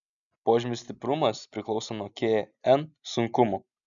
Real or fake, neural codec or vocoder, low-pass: real; none; 7.2 kHz